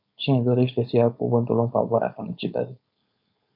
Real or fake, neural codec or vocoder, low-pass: fake; codec, 16 kHz, 4.8 kbps, FACodec; 5.4 kHz